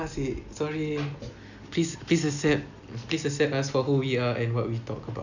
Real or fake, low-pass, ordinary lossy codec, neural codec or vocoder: real; 7.2 kHz; none; none